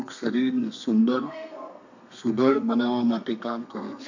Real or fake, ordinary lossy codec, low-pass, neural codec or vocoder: fake; none; 7.2 kHz; codec, 32 kHz, 1.9 kbps, SNAC